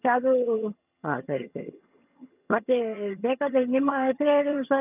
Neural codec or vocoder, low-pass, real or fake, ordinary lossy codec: vocoder, 22.05 kHz, 80 mel bands, HiFi-GAN; 3.6 kHz; fake; none